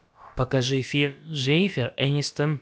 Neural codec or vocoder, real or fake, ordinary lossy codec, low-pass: codec, 16 kHz, about 1 kbps, DyCAST, with the encoder's durations; fake; none; none